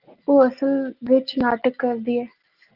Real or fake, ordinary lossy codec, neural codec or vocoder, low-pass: real; Opus, 32 kbps; none; 5.4 kHz